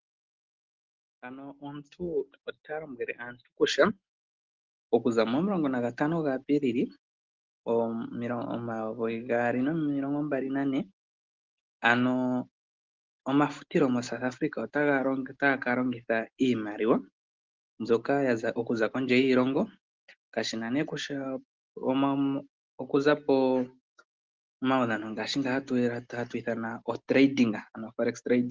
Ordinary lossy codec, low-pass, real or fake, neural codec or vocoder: Opus, 16 kbps; 7.2 kHz; real; none